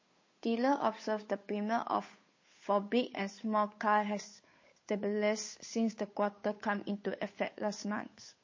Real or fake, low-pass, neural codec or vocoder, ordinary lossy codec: fake; 7.2 kHz; codec, 16 kHz, 8 kbps, FunCodec, trained on Chinese and English, 25 frames a second; MP3, 32 kbps